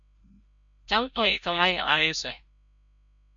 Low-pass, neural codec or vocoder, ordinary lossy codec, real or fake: 7.2 kHz; codec, 16 kHz, 0.5 kbps, FreqCodec, larger model; Opus, 64 kbps; fake